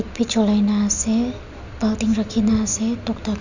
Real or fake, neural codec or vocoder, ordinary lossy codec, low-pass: real; none; none; 7.2 kHz